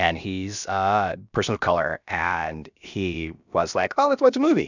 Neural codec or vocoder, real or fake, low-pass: codec, 16 kHz, about 1 kbps, DyCAST, with the encoder's durations; fake; 7.2 kHz